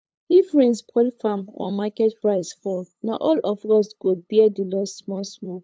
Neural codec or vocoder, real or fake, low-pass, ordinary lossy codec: codec, 16 kHz, 8 kbps, FunCodec, trained on LibriTTS, 25 frames a second; fake; none; none